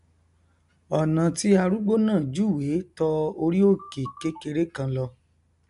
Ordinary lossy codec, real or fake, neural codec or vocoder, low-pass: none; real; none; 10.8 kHz